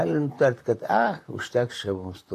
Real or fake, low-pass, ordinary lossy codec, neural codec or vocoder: fake; 14.4 kHz; AAC, 48 kbps; vocoder, 44.1 kHz, 128 mel bands, Pupu-Vocoder